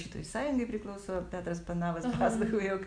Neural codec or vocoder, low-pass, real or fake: none; 9.9 kHz; real